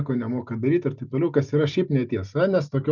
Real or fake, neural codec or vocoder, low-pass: real; none; 7.2 kHz